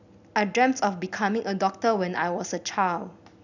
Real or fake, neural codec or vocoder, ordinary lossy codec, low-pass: real; none; none; 7.2 kHz